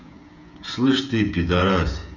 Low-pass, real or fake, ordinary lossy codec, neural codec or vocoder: 7.2 kHz; fake; none; codec, 16 kHz, 8 kbps, FreqCodec, smaller model